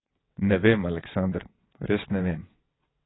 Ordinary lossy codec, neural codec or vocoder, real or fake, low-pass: AAC, 16 kbps; vocoder, 22.05 kHz, 80 mel bands, WaveNeXt; fake; 7.2 kHz